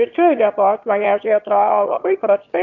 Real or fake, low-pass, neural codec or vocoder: fake; 7.2 kHz; autoencoder, 22.05 kHz, a latent of 192 numbers a frame, VITS, trained on one speaker